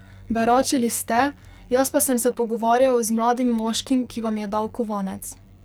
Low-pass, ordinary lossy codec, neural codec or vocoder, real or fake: none; none; codec, 44.1 kHz, 2.6 kbps, SNAC; fake